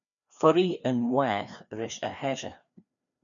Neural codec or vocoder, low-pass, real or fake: codec, 16 kHz, 2 kbps, FreqCodec, larger model; 7.2 kHz; fake